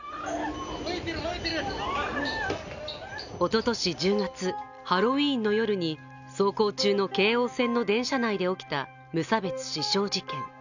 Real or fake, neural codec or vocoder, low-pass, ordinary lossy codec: real; none; 7.2 kHz; none